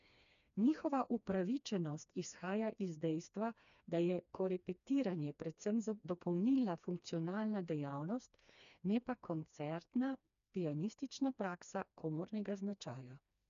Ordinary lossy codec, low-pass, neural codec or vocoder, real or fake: none; 7.2 kHz; codec, 16 kHz, 2 kbps, FreqCodec, smaller model; fake